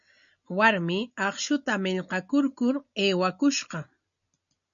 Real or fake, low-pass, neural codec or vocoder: real; 7.2 kHz; none